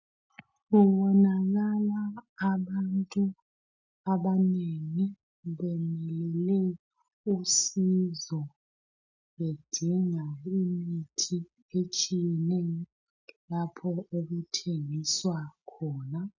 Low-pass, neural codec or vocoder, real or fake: 7.2 kHz; none; real